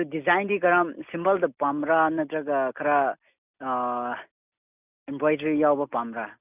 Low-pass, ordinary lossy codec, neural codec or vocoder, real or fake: 3.6 kHz; none; none; real